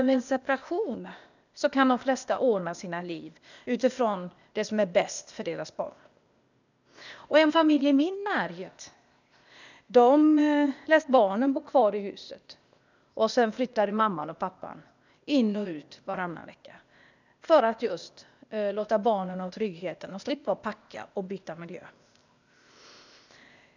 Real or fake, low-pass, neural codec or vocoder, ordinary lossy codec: fake; 7.2 kHz; codec, 16 kHz, 0.8 kbps, ZipCodec; none